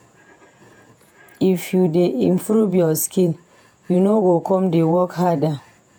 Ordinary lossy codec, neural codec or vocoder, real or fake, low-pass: none; vocoder, 48 kHz, 128 mel bands, Vocos; fake; none